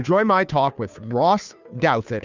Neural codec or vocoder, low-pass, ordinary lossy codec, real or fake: codec, 16 kHz, 2 kbps, FunCodec, trained on Chinese and English, 25 frames a second; 7.2 kHz; Opus, 64 kbps; fake